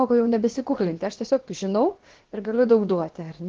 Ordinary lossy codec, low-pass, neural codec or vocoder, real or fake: Opus, 16 kbps; 7.2 kHz; codec, 16 kHz, about 1 kbps, DyCAST, with the encoder's durations; fake